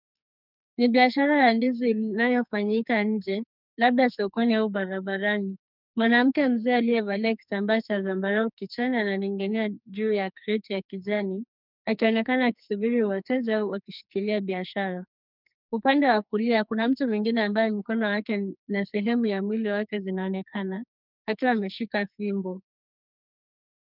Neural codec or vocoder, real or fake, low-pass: codec, 44.1 kHz, 2.6 kbps, SNAC; fake; 5.4 kHz